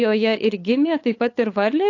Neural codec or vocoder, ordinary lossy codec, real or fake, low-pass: codec, 16 kHz, 8 kbps, FunCodec, trained on LibriTTS, 25 frames a second; AAC, 48 kbps; fake; 7.2 kHz